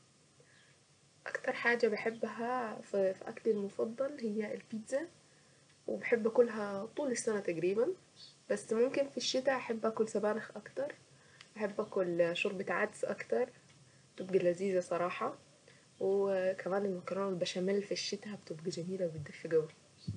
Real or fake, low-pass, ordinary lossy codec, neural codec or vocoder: real; 9.9 kHz; none; none